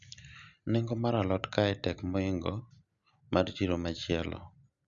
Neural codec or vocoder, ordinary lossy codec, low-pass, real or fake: none; none; 7.2 kHz; real